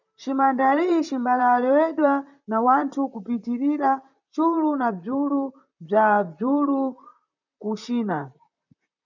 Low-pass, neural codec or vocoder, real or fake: 7.2 kHz; vocoder, 44.1 kHz, 128 mel bands, Pupu-Vocoder; fake